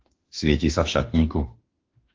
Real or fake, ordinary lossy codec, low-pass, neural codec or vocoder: fake; Opus, 16 kbps; 7.2 kHz; autoencoder, 48 kHz, 32 numbers a frame, DAC-VAE, trained on Japanese speech